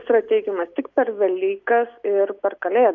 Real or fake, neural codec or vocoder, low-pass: real; none; 7.2 kHz